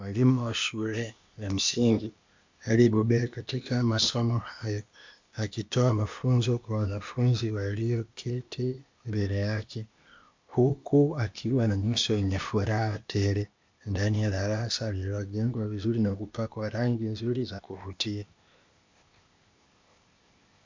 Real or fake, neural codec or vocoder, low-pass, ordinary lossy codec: fake; codec, 16 kHz, 0.8 kbps, ZipCodec; 7.2 kHz; MP3, 64 kbps